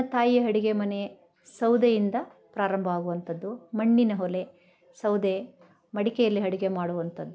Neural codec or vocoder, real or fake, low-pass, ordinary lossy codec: none; real; none; none